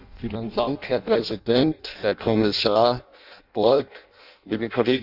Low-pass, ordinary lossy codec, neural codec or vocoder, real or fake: 5.4 kHz; none; codec, 16 kHz in and 24 kHz out, 0.6 kbps, FireRedTTS-2 codec; fake